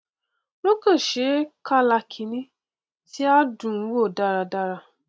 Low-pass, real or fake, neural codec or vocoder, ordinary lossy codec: none; real; none; none